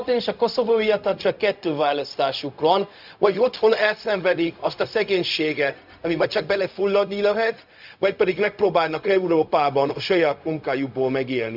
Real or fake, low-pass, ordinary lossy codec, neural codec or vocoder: fake; 5.4 kHz; none; codec, 16 kHz, 0.4 kbps, LongCat-Audio-Codec